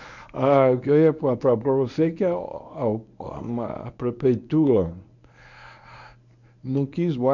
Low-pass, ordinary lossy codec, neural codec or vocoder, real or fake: 7.2 kHz; none; codec, 24 kHz, 0.9 kbps, WavTokenizer, medium speech release version 1; fake